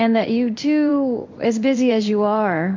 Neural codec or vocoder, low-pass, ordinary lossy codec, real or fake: codec, 16 kHz in and 24 kHz out, 1 kbps, XY-Tokenizer; 7.2 kHz; MP3, 48 kbps; fake